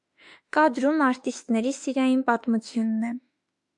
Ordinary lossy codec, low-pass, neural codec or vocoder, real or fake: AAC, 64 kbps; 10.8 kHz; autoencoder, 48 kHz, 32 numbers a frame, DAC-VAE, trained on Japanese speech; fake